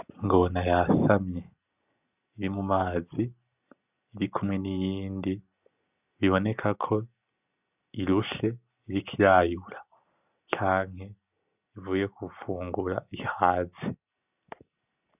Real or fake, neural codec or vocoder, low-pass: real; none; 3.6 kHz